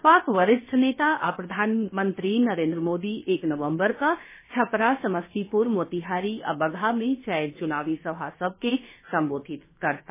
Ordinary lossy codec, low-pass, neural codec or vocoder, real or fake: MP3, 16 kbps; 3.6 kHz; codec, 16 kHz, about 1 kbps, DyCAST, with the encoder's durations; fake